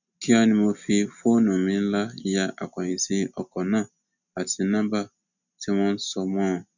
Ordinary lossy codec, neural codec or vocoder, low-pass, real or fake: none; none; 7.2 kHz; real